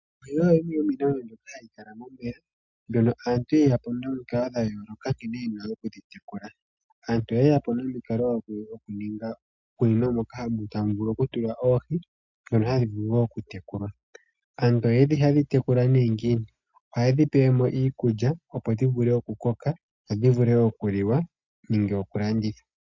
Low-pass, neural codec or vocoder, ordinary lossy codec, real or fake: 7.2 kHz; none; MP3, 64 kbps; real